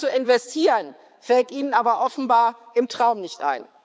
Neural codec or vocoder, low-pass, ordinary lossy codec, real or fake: codec, 16 kHz, 4 kbps, X-Codec, HuBERT features, trained on balanced general audio; none; none; fake